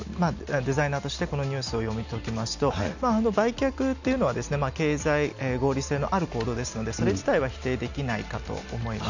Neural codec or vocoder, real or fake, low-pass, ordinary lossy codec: none; real; 7.2 kHz; none